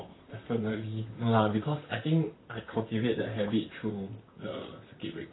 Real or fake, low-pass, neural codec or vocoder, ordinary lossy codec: fake; 7.2 kHz; vocoder, 44.1 kHz, 128 mel bands, Pupu-Vocoder; AAC, 16 kbps